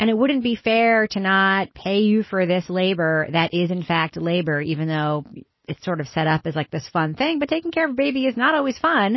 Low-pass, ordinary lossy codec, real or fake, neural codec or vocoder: 7.2 kHz; MP3, 24 kbps; real; none